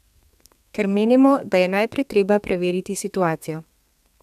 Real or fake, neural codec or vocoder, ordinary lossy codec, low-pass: fake; codec, 32 kHz, 1.9 kbps, SNAC; none; 14.4 kHz